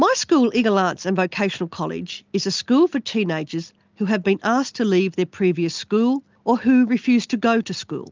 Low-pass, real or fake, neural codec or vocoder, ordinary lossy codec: 7.2 kHz; real; none; Opus, 32 kbps